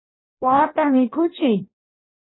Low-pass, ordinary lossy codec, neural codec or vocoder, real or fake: 7.2 kHz; AAC, 16 kbps; codec, 16 kHz in and 24 kHz out, 0.6 kbps, FireRedTTS-2 codec; fake